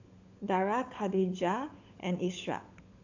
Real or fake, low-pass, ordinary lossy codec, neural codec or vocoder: fake; 7.2 kHz; AAC, 48 kbps; codec, 16 kHz, 16 kbps, FunCodec, trained on LibriTTS, 50 frames a second